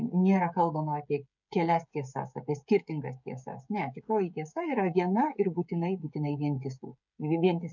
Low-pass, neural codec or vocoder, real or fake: 7.2 kHz; codec, 16 kHz, 16 kbps, FreqCodec, smaller model; fake